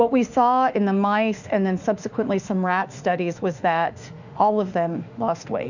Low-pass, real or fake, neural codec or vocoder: 7.2 kHz; fake; autoencoder, 48 kHz, 32 numbers a frame, DAC-VAE, trained on Japanese speech